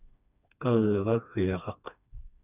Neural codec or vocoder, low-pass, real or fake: codec, 16 kHz, 2 kbps, FreqCodec, smaller model; 3.6 kHz; fake